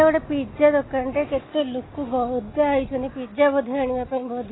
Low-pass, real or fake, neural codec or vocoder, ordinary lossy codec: 7.2 kHz; real; none; AAC, 16 kbps